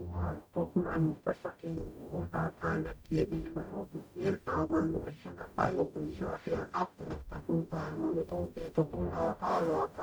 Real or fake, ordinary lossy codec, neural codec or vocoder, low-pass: fake; none; codec, 44.1 kHz, 0.9 kbps, DAC; none